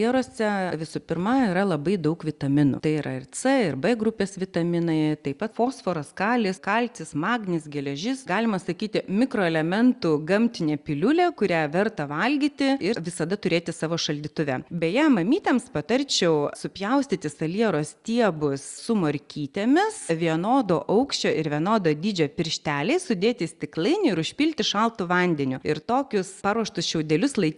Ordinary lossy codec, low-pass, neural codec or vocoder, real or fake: Opus, 64 kbps; 10.8 kHz; none; real